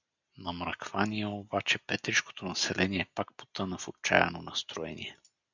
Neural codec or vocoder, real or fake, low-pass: none; real; 7.2 kHz